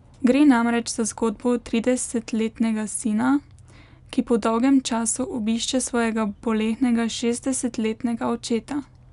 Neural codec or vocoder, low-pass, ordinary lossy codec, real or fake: none; 10.8 kHz; none; real